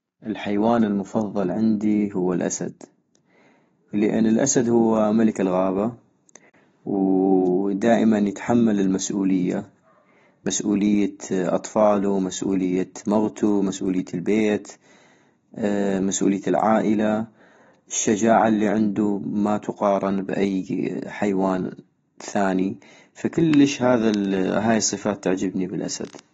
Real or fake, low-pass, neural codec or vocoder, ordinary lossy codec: real; 19.8 kHz; none; AAC, 24 kbps